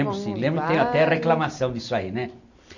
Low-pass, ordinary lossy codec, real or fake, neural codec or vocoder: 7.2 kHz; none; real; none